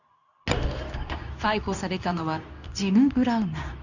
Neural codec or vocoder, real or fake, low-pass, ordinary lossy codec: codec, 24 kHz, 0.9 kbps, WavTokenizer, medium speech release version 2; fake; 7.2 kHz; none